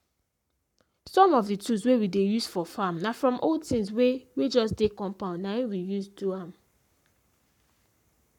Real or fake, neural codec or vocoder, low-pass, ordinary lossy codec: fake; codec, 44.1 kHz, 7.8 kbps, Pupu-Codec; 19.8 kHz; none